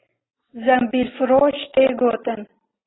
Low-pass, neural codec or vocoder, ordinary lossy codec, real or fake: 7.2 kHz; none; AAC, 16 kbps; real